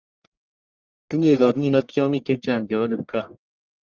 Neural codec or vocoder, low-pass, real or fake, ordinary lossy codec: codec, 44.1 kHz, 1.7 kbps, Pupu-Codec; 7.2 kHz; fake; Opus, 32 kbps